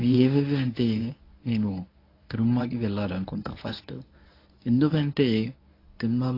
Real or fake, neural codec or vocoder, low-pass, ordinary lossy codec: fake; codec, 24 kHz, 0.9 kbps, WavTokenizer, medium speech release version 1; 5.4 kHz; AAC, 32 kbps